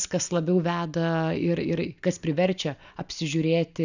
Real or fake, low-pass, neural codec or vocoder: real; 7.2 kHz; none